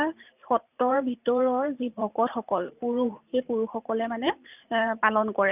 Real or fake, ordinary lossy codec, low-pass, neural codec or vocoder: fake; none; 3.6 kHz; vocoder, 44.1 kHz, 128 mel bands every 512 samples, BigVGAN v2